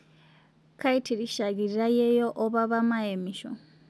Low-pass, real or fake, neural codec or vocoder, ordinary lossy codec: none; real; none; none